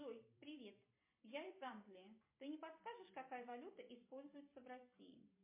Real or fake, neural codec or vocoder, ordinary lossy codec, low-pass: fake; vocoder, 44.1 kHz, 128 mel bands every 256 samples, BigVGAN v2; MP3, 24 kbps; 3.6 kHz